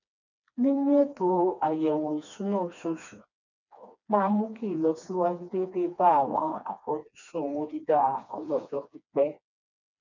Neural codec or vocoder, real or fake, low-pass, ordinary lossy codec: codec, 16 kHz, 2 kbps, FreqCodec, smaller model; fake; 7.2 kHz; none